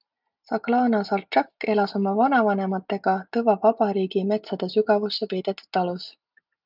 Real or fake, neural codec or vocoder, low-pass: real; none; 5.4 kHz